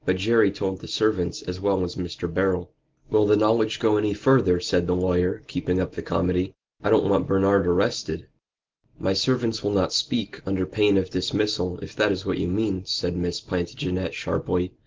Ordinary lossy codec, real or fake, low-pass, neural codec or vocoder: Opus, 16 kbps; real; 7.2 kHz; none